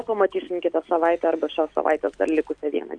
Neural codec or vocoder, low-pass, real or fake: none; 9.9 kHz; real